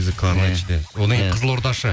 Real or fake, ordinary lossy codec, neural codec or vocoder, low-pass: real; none; none; none